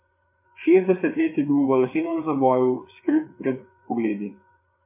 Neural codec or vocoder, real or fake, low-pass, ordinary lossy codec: codec, 16 kHz, 4 kbps, FreqCodec, larger model; fake; 3.6 kHz; MP3, 24 kbps